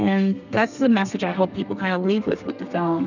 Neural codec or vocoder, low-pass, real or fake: codec, 32 kHz, 1.9 kbps, SNAC; 7.2 kHz; fake